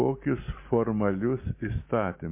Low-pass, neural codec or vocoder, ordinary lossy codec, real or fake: 3.6 kHz; none; MP3, 24 kbps; real